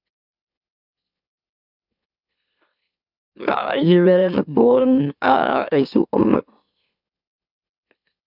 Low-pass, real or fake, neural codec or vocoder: 5.4 kHz; fake; autoencoder, 44.1 kHz, a latent of 192 numbers a frame, MeloTTS